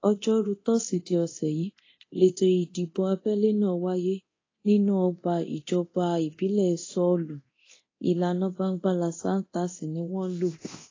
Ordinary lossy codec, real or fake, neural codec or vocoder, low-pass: AAC, 32 kbps; fake; codec, 24 kHz, 0.9 kbps, DualCodec; 7.2 kHz